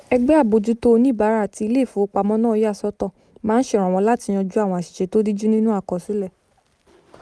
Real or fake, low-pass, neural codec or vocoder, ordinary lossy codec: real; none; none; none